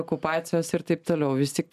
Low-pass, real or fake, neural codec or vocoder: 14.4 kHz; real; none